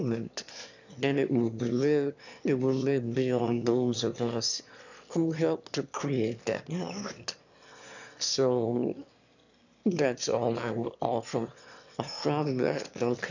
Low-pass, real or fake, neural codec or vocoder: 7.2 kHz; fake; autoencoder, 22.05 kHz, a latent of 192 numbers a frame, VITS, trained on one speaker